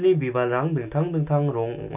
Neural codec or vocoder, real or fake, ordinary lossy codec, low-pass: none; real; none; 3.6 kHz